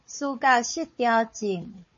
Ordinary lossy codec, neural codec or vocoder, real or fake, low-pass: MP3, 32 kbps; codec, 16 kHz, 4 kbps, FunCodec, trained on Chinese and English, 50 frames a second; fake; 7.2 kHz